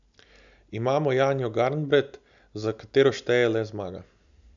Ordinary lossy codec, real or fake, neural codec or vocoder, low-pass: MP3, 96 kbps; real; none; 7.2 kHz